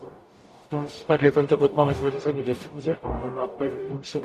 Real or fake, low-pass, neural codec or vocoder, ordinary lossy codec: fake; 14.4 kHz; codec, 44.1 kHz, 0.9 kbps, DAC; AAC, 64 kbps